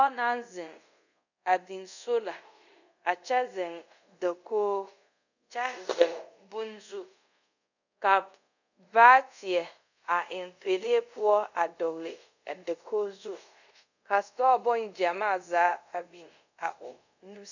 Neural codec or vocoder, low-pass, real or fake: codec, 24 kHz, 0.5 kbps, DualCodec; 7.2 kHz; fake